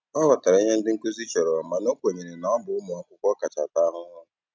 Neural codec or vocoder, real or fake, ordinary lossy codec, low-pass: none; real; none; none